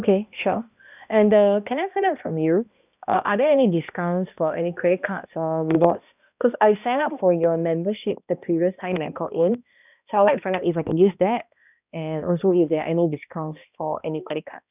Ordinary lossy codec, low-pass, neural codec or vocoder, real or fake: none; 3.6 kHz; codec, 16 kHz, 1 kbps, X-Codec, HuBERT features, trained on balanced general audio; fake